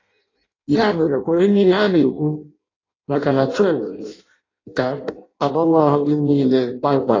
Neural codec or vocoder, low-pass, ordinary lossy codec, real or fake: codec, 16 kHz in and 24 kHz out, 0.6 kbps, FireRedTTS-2 codec; 7.2 kHz; AAC, 32 kbps; fake